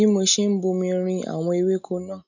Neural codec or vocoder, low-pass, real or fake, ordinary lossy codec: none; 7.2 kHz; real; none